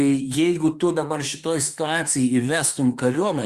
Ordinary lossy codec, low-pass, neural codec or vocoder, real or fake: Opus, 64 kbps; 14.4 kHz; autoencoder, 48 kHz, 32 numbers a frame, DAC-VAE, trained on Japanese speech; fake